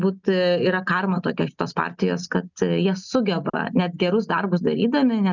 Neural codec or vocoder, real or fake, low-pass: none; real; 7.2 kHz